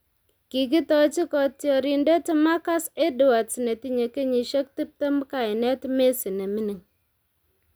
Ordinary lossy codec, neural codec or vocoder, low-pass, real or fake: none; none; none; real